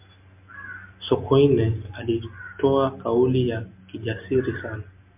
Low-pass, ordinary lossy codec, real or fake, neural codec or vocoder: 3.6 kHz; MP3, 32 kbps; real; none